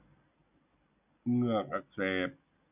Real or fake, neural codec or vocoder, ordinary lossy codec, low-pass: real; none; none; 3.6 kHz